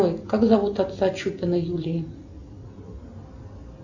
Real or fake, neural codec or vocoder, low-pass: real; none; 7.2 kHz